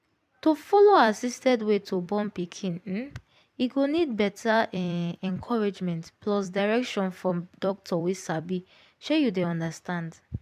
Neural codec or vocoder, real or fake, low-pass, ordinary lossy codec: vocoder, 44.1 kHz, 128 mel bands every 256 samples, BigVGAN v2; fake; 14.4 kHz; MP3, 96 kbps